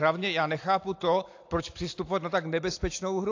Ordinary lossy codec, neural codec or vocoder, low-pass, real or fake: AAC, 48 kbps; none; 7.2 kHz; real